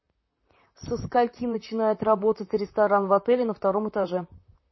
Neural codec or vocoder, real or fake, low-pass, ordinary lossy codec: vocoder, 44.1 kHz, 128 mel bands, Pupu-Vocoder; fake; 7.2 kHz; MP3, 24 kbps